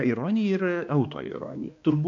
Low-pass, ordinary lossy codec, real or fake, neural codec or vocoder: 7.2 kHz; AAC, 48 kbps; fake; codec, 16 kHz, 2 kbps, X-Codec, HuBERT features, trained on balanced general audio